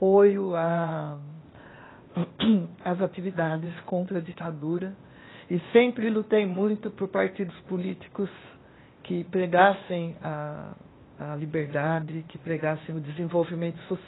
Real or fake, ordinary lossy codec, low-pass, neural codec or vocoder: fake; AAC, 16 kbps; 7.2 kHz; codec, 16 kHz, 0.8 kbps, ZipCodec